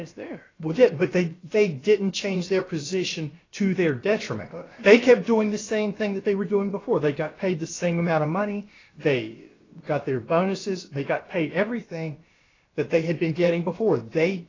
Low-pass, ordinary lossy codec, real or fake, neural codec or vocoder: 7.2 kHz; AAC, 32 kbps; fake; codec, 16 kHz, about 1 kbps, DyCAST, with the encoder's durations